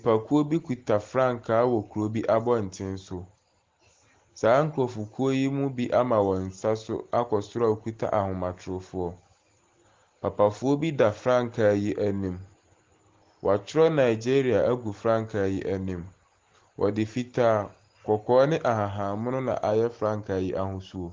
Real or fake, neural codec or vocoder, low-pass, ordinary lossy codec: real; none; 7.2 kHz; Opus, 16 kbps